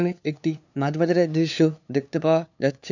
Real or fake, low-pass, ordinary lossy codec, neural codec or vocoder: fake; 7.2 kHz; none; codec, 16 kHz, 2 kbps, FunCodec, trained on LibriTTS, 25 frames a second